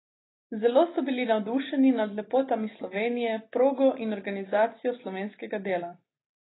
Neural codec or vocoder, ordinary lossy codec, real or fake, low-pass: none; AAC, 16 kbps; real; 7.2 kHz